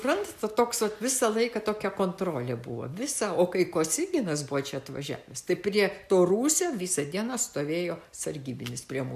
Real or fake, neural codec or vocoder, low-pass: real; none; 14.4 kHz